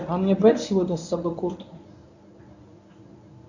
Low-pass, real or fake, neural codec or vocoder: 7.2 kHz; fake; codec, 24 kHz, 0.9 kbps, WavTokenizer, medium speech release version 1